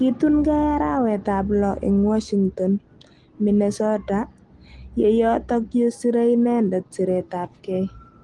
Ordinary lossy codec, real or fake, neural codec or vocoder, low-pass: Opus, 24 kbps; real; none; 10.8 kHz